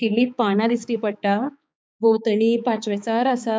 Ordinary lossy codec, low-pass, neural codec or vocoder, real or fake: none; none; codec, 16 kHz, 4 kbps, X-Codec, HuBERT features, trained on balanced general audio; fake